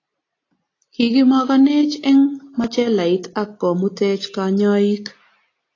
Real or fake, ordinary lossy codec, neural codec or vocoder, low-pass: real; AAC, 32 kbps; none; 7.2 kHz